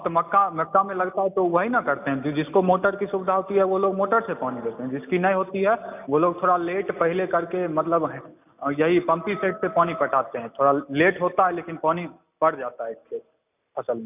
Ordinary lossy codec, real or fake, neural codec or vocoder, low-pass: none; real; none; 3.6 kHz